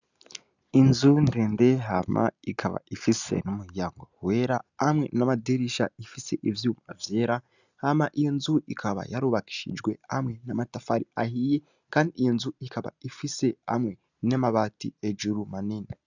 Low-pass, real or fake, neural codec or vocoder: 7.2 kHz; real; none